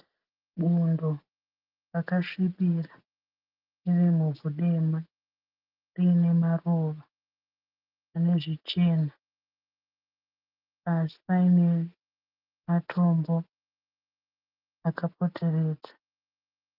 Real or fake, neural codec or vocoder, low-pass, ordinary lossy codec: real; none; 5.4 kHz; Opus, 32 kbps